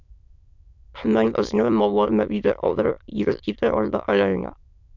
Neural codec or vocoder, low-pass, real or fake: autoencoder, 22.05 kHz, a latent of 192 numbers a frame, VITS, trained on many speakers; 7.2 kHz; fake